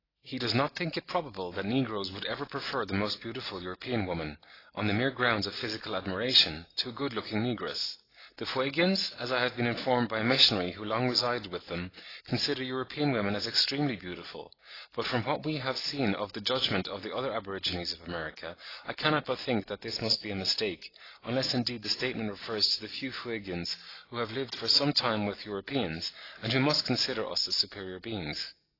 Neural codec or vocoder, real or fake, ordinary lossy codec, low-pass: none; real; AAC, 24 kbps; 5.4 kHz